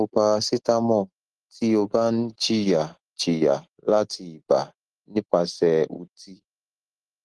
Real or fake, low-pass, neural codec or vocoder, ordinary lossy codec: real; 10.8 kHz; none; Opus, 16 kbps